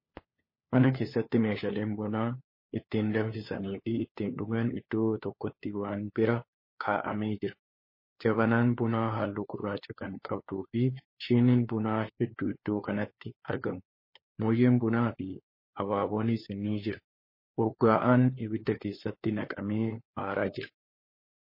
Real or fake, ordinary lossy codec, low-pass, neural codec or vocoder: fake; MP3, 24 kbps; 5.4 kHz; codec, 16 kHz, 4 kbps, FunCodec, trained on LibriTTS, 50 frames a second